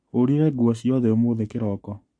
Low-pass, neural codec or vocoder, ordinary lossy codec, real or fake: 9.9 kHz; codec, 44.1 kHz, 7.8 kbps, Pupu-Codec; MP3, 48 kbps; fake